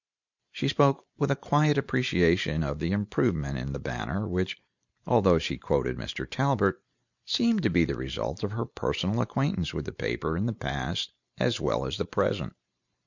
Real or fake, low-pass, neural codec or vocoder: fake; 7.2 kHz; vocoder, 44.1 kHz, 128 mel bands every 512 samples, BigVGAN v2